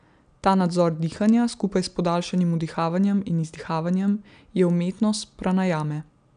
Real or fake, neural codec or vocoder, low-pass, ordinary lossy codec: real; none; 9.9 kHz; none